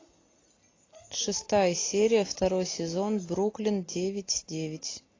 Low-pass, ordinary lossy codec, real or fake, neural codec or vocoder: 7.2 kHz; AAC, 32 kbps; real; none